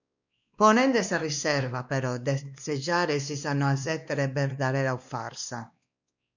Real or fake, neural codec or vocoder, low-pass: fake; codec, 16 kHz, 2 kbps, X-Codec, WavLM features, trained on Multilingual LibriSpeech; 7.2 kHz